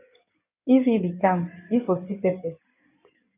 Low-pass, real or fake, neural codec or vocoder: 3.6 kHz; fake; codec, 16 kHz in and 24 kHz out, 2.2 kbps, FireRedTTS-2 codec